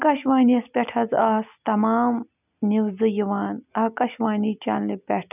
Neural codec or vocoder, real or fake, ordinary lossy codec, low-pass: none; real; none; 3.6 kHz